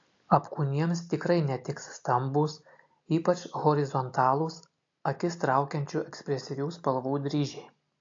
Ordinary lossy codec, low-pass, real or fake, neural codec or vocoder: AAC, 48 kbps; 7.2 kHz; real; none